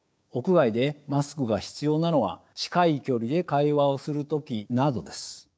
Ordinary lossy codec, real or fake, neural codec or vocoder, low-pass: none; fake; codec, 16 kHz, 6 kbps, DAC; none